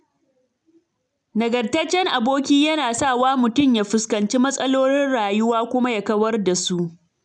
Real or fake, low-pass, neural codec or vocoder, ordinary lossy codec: real; 10.8 kHz; none; none